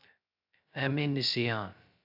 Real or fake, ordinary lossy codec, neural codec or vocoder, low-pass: fake; AAC, 48 kbps; codec, 16 kHz, 0.2 kbps, FocalCodec; 5.4 kHz